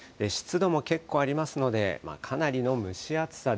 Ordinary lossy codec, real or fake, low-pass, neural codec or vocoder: none; real; none; none